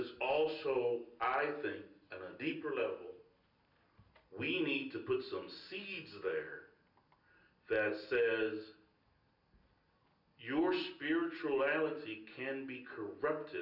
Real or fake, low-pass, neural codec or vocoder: real; 5.4 kHz; none